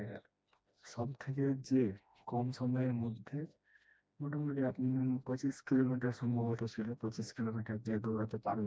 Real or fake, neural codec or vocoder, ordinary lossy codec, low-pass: fake; codec, 16 kHz, 1 kbps, FreqCodec, smaller model; none; none